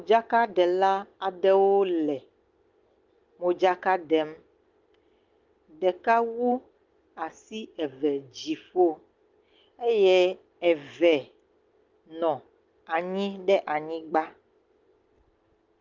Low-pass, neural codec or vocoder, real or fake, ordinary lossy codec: 7.2 kHz; none; real; Opus, 32 kbps